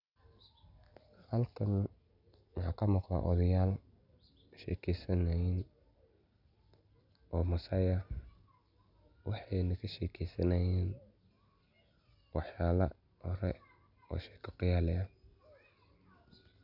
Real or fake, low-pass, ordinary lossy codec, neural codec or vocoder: real; 5.4 kHz; none; none